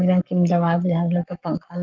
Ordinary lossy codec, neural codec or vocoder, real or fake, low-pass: Opus, 24 kbps; codec, 44.1 kHz, 7.8 kbps, Pupu-Codec; fake; 7.2 kHz